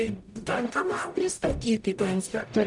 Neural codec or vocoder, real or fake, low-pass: codec, 44.1 kHz, 0.9 kbps, DAC; fake; 10.8 kHz